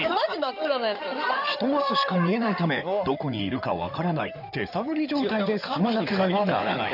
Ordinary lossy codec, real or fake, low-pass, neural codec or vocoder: none; fake; 5.4 kHz; codec, 16 kHz in and 24 kHz out, 2.2 kbps, FireRedTTS-2 codec